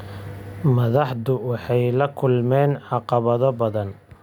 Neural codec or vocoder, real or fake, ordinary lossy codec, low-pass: none; real; none; 19.8 kHz